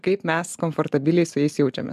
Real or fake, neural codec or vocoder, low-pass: real; none; 14.4 kHz